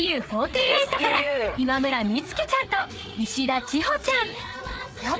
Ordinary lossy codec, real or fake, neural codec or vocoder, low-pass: none; fake; codec, 16 kHz, 4 kbps, FreqCodec, larger model; none